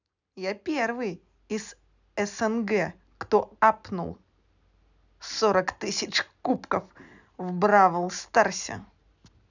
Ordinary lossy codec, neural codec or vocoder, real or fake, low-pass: none; none; real; 7.2 kHz